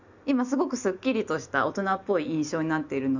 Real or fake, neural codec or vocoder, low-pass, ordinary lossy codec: real; none; 7.2 kHz; none